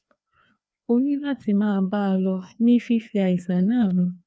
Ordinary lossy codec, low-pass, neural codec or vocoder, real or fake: none; none; codec, 16 kHz, 2 kbps, FreqCodec, larger model; fake